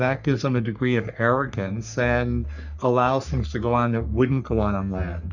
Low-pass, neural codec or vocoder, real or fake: 7.2 kHz; codec, 44.1 kHz, 3.4 kbps, Pupu-Codec; fake